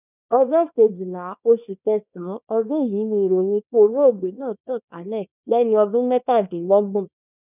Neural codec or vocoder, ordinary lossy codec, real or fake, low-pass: codec, 24 kHz, 0.9 kbps, WavTokenizer, small release; none; fake; 3.6 kHz